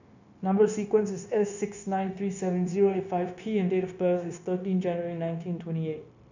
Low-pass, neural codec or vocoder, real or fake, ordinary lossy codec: 7.2 kHz; codec, 16 kHz, 0.9 kbps, LongCat-Audio-Codec; fake; none